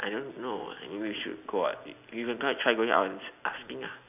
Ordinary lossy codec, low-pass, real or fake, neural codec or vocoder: none; 3.6 kHz; real; none